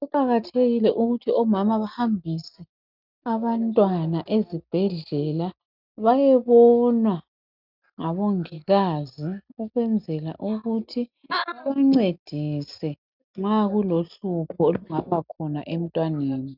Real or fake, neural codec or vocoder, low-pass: real; none; 5.4 kHz